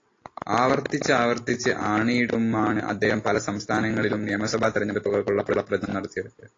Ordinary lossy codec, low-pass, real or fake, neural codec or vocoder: AAC, 32 kbps; 7.2 kHz; real; none